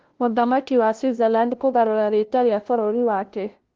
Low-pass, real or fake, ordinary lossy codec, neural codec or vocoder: 7.2 kHz; fake; Opus, 16 kbps; codec, 16 kHz, 0.5 kbps, FunCodec, trained on LibriTTS, 25 frames a second